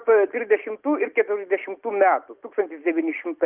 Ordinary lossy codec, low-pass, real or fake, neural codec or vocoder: Opus, 24 kbps; 3.6 kHz; real; none